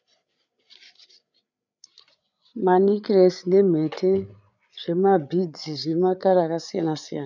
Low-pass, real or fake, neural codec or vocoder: 7.2 kHz; fake; codec, 16 kHz, 8 kbps, FreqCodec, larger model